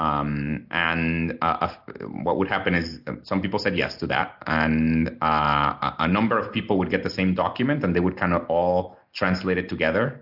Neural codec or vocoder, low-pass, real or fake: none; 5.4 kHz; real